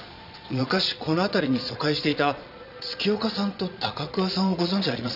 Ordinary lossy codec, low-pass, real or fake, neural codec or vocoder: none; 5.4 kHz; fake; vocoder, 22.05 kHz, 80 mel bands, Vocos